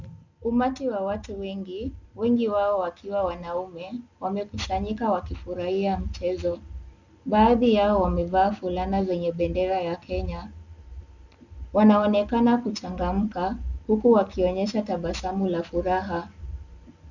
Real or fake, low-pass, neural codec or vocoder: real; 7.2 kHz; none